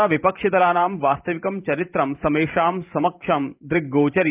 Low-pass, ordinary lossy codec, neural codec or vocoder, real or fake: 3.6 kHz; Opus, 24 kbps; vocoder, 44.1 kHz, 128 mel bands every 512 samples, BigVGAN v2; fake